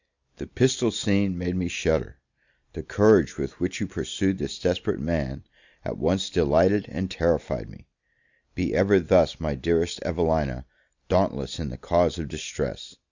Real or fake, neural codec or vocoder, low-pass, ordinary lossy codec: real; none; 7.2 kHz; Opus, 64 kbps